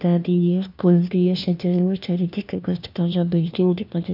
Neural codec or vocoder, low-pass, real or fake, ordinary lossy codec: codec, 16 kHz, 1 kbps, FunCodec, trained on LibriTTS, 50 frames a second; 5.4 kHz; fake; none